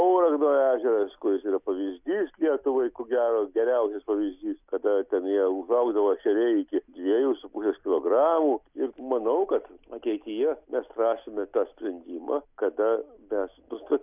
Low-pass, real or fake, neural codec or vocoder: 3.6 kHz; real; none